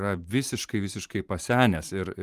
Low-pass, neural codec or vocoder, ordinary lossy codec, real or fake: 14.4 kHz; vocoder, 44.1 kHz, 128 mel bands every 512 samples, BigVGAN v2; Opus, 24 kbps; fake